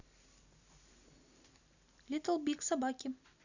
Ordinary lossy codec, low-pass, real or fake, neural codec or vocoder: none; 7.2 kHz; real; none